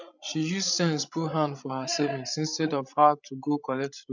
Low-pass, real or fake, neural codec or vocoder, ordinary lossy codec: 7.2 kHz; fake; codec, 16 kHz, 16 kbps, FreqCodec, larger model; none